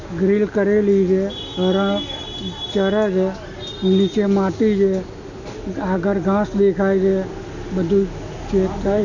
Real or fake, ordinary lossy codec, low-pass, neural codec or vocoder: real; none; 7.2 kHz; none